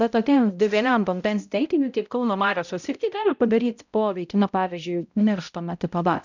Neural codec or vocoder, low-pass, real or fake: codec, 16 kHz, 0.5 kbps, X-Codec, HuBERT features, trained on balanced general audio; 7.2 kHz; fake